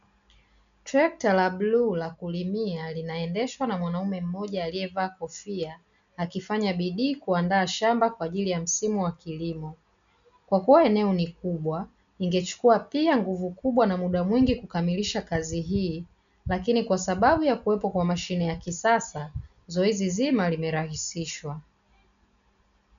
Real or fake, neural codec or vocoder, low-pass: real; none; 7.2 kHz